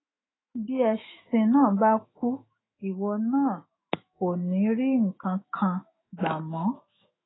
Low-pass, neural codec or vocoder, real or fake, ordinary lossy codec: 7.2 kHz; autoencoder, 48 kHz, 128 numbers a frame, DAC-VAE, trained on Japanese speech; fake; AAC, 16 kbps